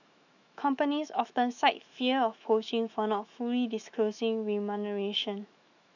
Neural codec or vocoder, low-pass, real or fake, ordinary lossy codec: autoencoder, 48 kHz, 128 numbers a frame, DAC-VAE, trained on Japanese speech; 7.2 kHz; fake; none